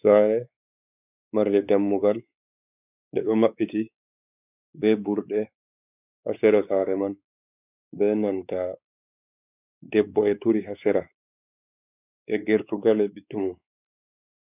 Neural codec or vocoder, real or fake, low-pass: codec, 16 kHz, 4 kbps, X-Codec, WavLM features, trained on Multilingual LibriSpeech; fake; 3.6 kHz